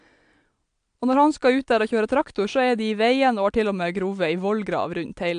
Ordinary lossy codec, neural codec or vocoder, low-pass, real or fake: AAC, 64 kbps; none; 9.9 kHz; real